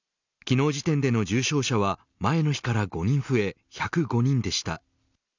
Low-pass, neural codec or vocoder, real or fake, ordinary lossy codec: 7.2 kHz; none; real; none